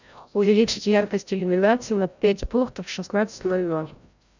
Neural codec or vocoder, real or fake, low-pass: codec, 16 kHz, 0.5 kbps, FreqCodec, larger model; fake; 7.2 kHz